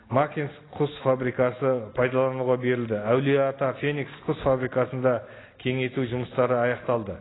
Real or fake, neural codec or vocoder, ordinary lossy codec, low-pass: real; none; AAC, 16 kbps; 7.2 kHz